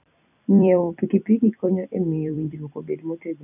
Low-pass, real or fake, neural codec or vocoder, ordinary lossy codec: 3.6 kHz; fake; vocoder, 44.1 kHz, 128 mel bands every 256 samples, BigVGAN v2; none